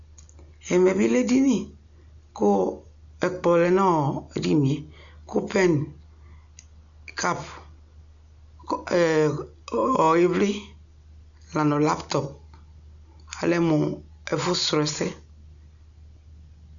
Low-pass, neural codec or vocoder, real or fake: 7.2 kHz; none; real